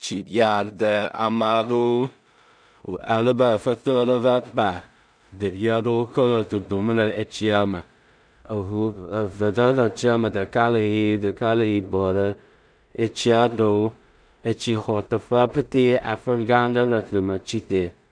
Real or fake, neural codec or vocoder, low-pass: fake; codec, 16 kHz in and 24 kHz out, 0.4 kbps, LongCat-Audio-Codec, two codebook decoder; 9.9 kHz